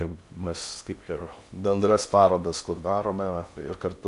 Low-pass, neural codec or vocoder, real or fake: 10.8 kHz; codec, 16 kHz in and 24 kHz out, 0.6 kbps, FocalCodec, streaming, 2048 codes; fake